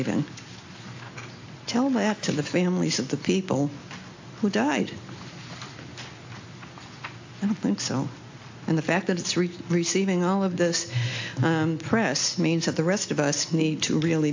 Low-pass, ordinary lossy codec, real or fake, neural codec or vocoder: 7.2 kHz; AAC, 48 kbps; real; none